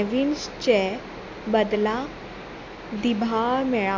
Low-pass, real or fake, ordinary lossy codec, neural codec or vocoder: 7.2 kHz; real; MP3, 48 kbps; none